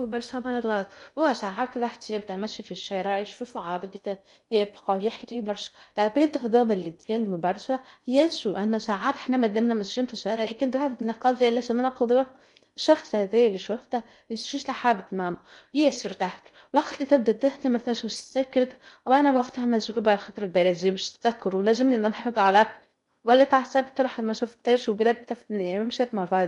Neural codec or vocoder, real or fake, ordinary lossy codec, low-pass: codec, 16 kHz in and 24 kHz out, 0.6 kbps, FocalCodec, streaming, 2048 codes; fake; none; 10.8 kHz